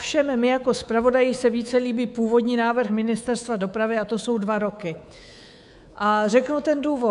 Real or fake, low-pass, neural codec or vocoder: fake; 10.8 kHz; codec, 24 kHz, 3.1 kbps, DualCodec